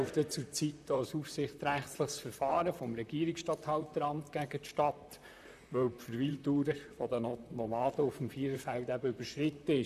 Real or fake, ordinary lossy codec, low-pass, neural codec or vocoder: fake; none; 14.4 kHz; vocoder, 44.1 kHz, 128 mel bands, Pupu-Vocoder